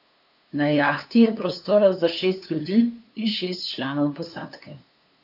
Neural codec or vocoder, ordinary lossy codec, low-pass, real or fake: codec, 16 kHz, 2 kbps, FunCodec, trained on LibriTTS, 25 frames a second; none; 5.4 kHz; fake